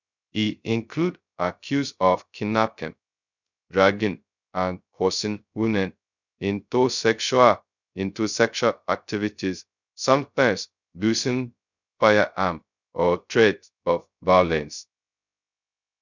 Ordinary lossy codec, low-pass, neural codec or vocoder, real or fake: none; 7.2 kHz; codec, 16 kHz, 0.2 kbps, FocalCodec; fake